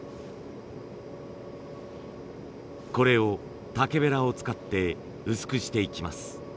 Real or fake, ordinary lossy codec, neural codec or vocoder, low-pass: real; none; none; none